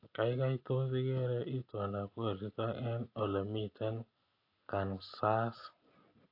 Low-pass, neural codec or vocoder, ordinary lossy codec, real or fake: 5.4 kHz; none; AAC, 32 kbps; real